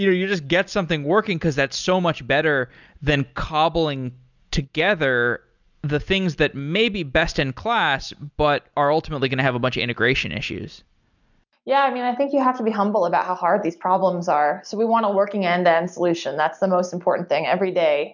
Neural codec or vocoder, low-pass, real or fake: none; 7.2 kHz; real